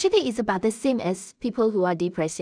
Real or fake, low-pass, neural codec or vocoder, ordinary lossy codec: fake; 9.9 kHz; codec, 16 kHz in and 24 kHz out, 0.4 kbps, LongCat-Audio-Codec, two codebook decoder; none